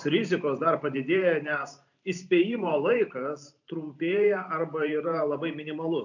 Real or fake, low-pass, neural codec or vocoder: fake; 7.2 kHz; vocoder, 44.1 kHz, 128 mel bands every 512 samples, BigVGAN v2